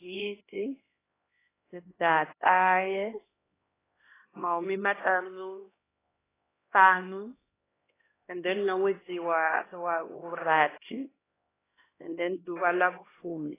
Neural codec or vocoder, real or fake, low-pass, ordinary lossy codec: codec, 16 kHz, 1 kbps, X-Codec, HuBERT features, trained on LibriSpeech; fake; 3.6 kHz; AAC, 16 kbps